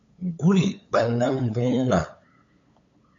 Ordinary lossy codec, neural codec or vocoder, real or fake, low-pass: MP3, 64 kbps; codec, 16 kHz, 8 kbps, FunCodec, trained on LibriTTS, 25 frames a second; fake; 7.2 kHz